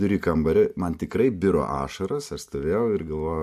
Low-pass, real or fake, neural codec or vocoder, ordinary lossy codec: 14.4 kHz; real; none; MP3, 64 kbps